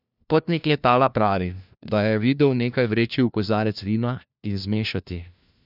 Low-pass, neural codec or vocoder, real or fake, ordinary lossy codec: 5.4 kHz; codec, 16 kHz, 1 kbps, FunCodec, trained on LibriTTS, 50 frames a second; fake; none